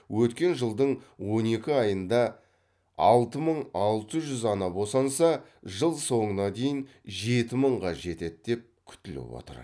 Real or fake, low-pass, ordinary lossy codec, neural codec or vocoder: real; none; none; none